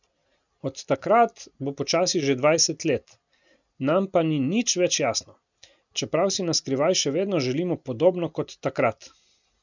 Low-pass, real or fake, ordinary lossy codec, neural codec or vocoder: 7.2 kHz; real; none; none